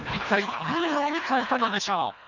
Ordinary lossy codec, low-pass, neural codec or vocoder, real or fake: none; 7.2 kHz; codec, 24 kHz, 1.5 kbps, HILCodec; fake